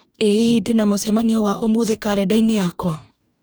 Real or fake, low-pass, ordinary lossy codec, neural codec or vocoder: fake; none; none; codec, 44.1 kHz, 2.6 kbps, DAC